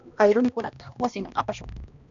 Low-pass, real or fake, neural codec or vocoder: 7.2 kHz; fake; codec, 16 kHz, 1 kbps, X-Codec, HuBERT features, trained on balanced general audio